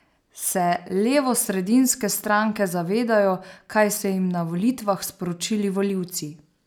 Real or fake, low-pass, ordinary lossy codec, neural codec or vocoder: real; none; none; none